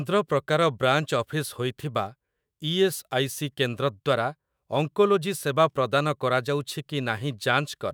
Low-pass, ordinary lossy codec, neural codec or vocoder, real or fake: none; none; autoencoder, 48 kHz, 128 numbers a frame, DAC-VAE, trained on Japanese speech; fake